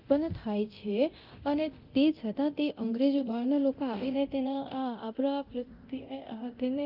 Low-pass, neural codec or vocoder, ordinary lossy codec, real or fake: 5.4 kHz; codec, 24 kHz, 0.9 kbps, DualCodec; Opus, 24 kbps; fake